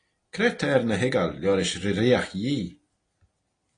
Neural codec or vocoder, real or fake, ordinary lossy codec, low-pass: none; real; AAC, 32 kbps; 9.9 kHz